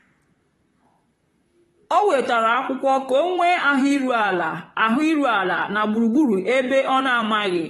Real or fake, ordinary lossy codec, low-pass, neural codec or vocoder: fake; AAC, 32 kbps; 19.8 kHz; vocoder, 44.1 kHz, 128 mel bands, Pupu-Vocoder